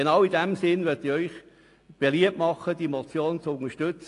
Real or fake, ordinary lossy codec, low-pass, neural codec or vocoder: real; AAC, 48 kbps; 10.8 kHz; none